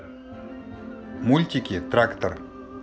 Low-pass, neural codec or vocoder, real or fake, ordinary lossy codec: none; none; real; none